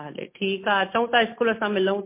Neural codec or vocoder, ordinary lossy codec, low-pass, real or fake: none; MP3, 24 kbps; 3.6 kHz; real